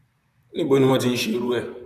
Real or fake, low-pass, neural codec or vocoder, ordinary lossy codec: fake; 14.4 kHz; vocoder, 44.1 kHz, 128 mel bands, Pupu-Vocoder; none